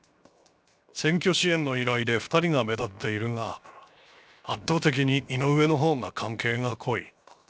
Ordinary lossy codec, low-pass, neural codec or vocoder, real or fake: none; none; codec, 16 kHz, 0.7 kbps, FocalCodec; fake